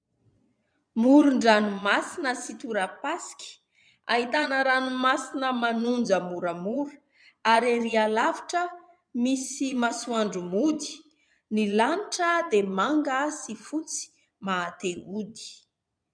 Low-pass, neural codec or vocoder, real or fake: 9.9 kHz; vocoder, 22.05 kHz, 80 mel bands, Vocos; fake